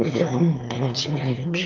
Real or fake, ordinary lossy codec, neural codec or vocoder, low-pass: fake; Opus, 32 kbps; autoencoder, 22.05 kHz, a latent of 192 numbers a frame, VITS, trained on one speaker; 7.2 kHz